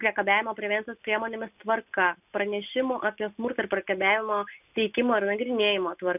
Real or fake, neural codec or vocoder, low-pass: real; none; 3.6 kHz